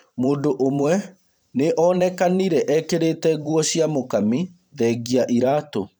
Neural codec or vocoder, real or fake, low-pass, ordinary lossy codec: vocoder, 44.1 kHz, 128 mel bands every 512 samples, BigVGAN v2; fake; none; none